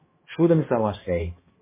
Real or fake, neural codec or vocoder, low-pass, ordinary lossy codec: fake; codec, 16 kHz, 1 kbps, X-Codec, HuBERT features, trained on balanced general audio; 3.6 kHz; MP3, 16 kbps